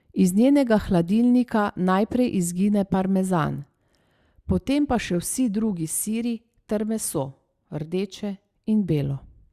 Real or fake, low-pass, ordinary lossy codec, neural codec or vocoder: real; 14.4 kHz; Opus, 64 kbps; none